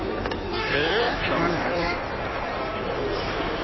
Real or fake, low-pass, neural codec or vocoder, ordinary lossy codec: fake; 7.2 kHz; codec, 16 kHz in and 24 kHz out, 1.1 kbps, FireRedTTS-2 codec; MP3, 24 kbps